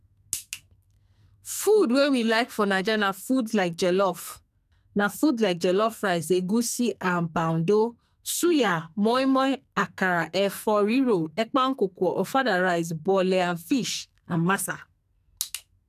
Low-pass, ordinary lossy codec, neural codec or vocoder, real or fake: 14.4 kHz; none; codec, 44.1 kHz, 2.6 kbps, SNAC; fake